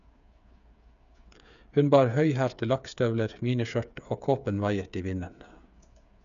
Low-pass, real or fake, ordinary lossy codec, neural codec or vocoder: 7.2 kHz; fake; none; codec, 16 kHz, 8 kbps, FreqCodec, smaller model